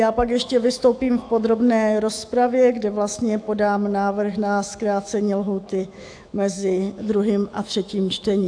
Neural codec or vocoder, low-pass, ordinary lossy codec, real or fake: autoencoder, 48 kHz, 128 numbers a frame, DAC-VAE, trained on Japanese speech; 9.9 kHz; AAC, 64 kbps; fake